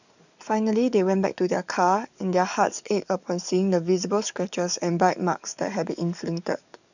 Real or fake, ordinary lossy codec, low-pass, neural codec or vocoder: fake; none; 7.2 kHz; codec, 44.1 kHz, 7.8 kbps, DAC